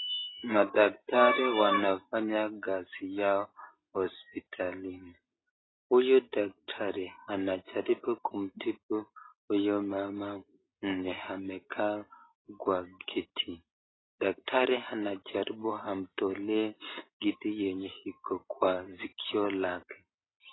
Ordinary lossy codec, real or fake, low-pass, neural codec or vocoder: AAC, 16 kbps; real; 7.2 kHz; none